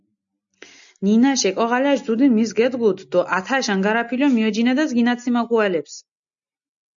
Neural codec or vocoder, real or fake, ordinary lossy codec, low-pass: none; real; MP3, 64 kbps; 7.2 kHz